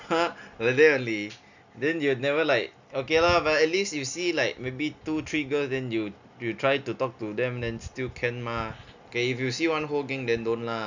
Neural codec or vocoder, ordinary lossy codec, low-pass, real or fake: none; none; 7.2 kHz; real